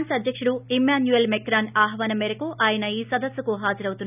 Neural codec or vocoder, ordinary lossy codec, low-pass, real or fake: none; none; 3.6 kHz; real